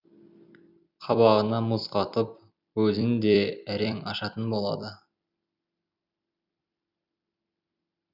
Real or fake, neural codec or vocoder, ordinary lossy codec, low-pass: fake; vocoder, 44.1 kHz, 128 mel bands every 256 samples, BigVGAN v2; none; 5.4 kHz